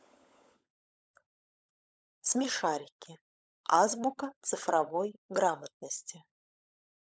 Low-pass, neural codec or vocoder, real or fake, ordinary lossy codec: none; codec, 16 kHz, 16 kbps, FunCodec, trained on LibriTTS, 50 frames a second; fake; none